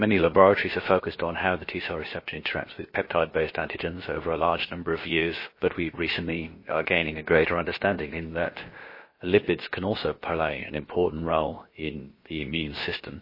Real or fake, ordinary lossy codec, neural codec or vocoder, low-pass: fake; MP3, 24 kbps; codec, 16 kHz, about 1 kbps, DyCAST, with the encoder's durations; 5.4 kHz